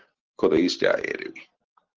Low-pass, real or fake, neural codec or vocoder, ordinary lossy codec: 7.2 kHz; real; none; Opus, 16 kbps